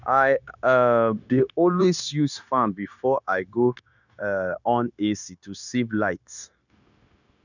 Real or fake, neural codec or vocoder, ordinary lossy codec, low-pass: fake; codec, 16 kHz, 0.9 kbps, LongCat-Audio-Codec; none; 7.2 kHz